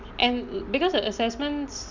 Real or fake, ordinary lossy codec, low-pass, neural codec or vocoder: real; none; 7.2 kHz; none